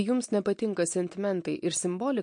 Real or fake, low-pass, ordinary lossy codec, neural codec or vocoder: real; 9.9 kHz; MP3, 48 kbps; none